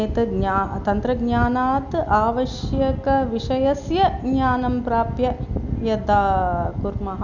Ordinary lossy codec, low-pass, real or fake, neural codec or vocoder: none; 7.2 kHz; real; none